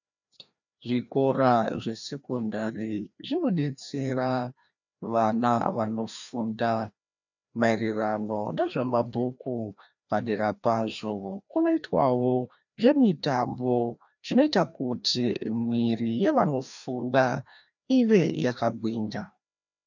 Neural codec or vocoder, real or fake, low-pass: codec, 16 kHz, 1 kbps, FreqCodec, larger model; fake; 7.2 kHz